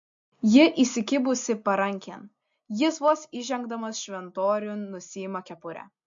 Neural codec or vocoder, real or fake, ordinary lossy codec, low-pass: none; real; MP3, 48 kbps; 7.2 kHz